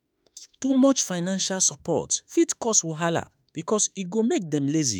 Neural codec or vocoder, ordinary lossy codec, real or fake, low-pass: autoencoder, 48 kHz, 32 numbers a frame, DAC-VAE, trained on Japanese speech; none; fake; none